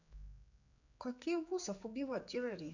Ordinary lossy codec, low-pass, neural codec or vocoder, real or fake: none; 7.2 kHz; codec, 16 kHz, 4 kbps, X-Codec, HuBERT features, trained on general audio; fake